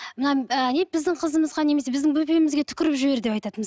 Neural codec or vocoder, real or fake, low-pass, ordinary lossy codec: none; real; none; none